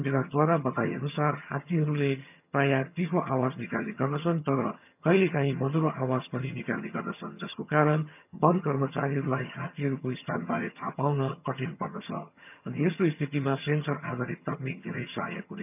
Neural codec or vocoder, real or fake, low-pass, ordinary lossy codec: vocoder, 22.05 kHz, 80 mel bands, HiFi-GAN; fake; 3.6 kHz; none